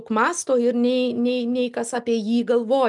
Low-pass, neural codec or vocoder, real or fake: 10.8 kHz; none; real